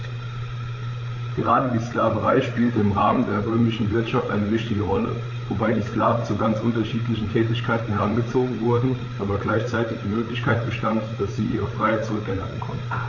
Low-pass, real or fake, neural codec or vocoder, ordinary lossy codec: 7.2 kHz; fake; codec, 16 kHz, 8 kbps, FreqCodec, larger model; AAC, 48 kbps